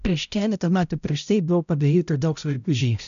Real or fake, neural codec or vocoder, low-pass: fake; codec, 16 kHz, 0.5 kbps, X-Codec, HuBERT features, trained on balanced general audio; 7.2 kHz